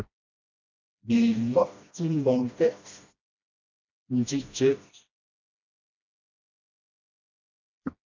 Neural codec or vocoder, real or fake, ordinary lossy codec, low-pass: codec, 16 kHz, 1 kbps, FreqCodec, smaller model; fake; MP3, 64 kbps; 7.2 kHz